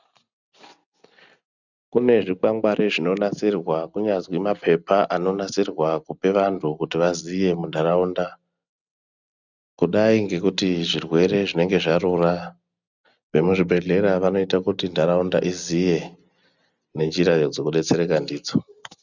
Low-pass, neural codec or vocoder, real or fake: 7.2 kHz; none; real